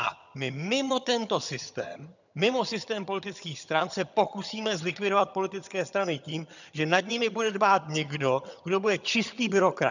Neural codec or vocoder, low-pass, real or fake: vocoder, 22.05 kHz, 80 mel bands, HiFi-GAN; 7.2 kHz; fake